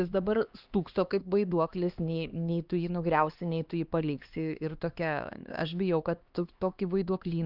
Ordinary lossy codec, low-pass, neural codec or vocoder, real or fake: Opus, 24 kbps; 5.4 kHz; codec, 16 kHz, 2 kbps, X-Codec, HuBERT features, trained on LibriSpeech; fake